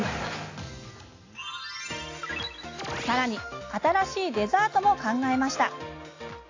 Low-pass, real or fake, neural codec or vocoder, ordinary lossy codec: 7.2 kHz; real; none; AAC, 32 kbps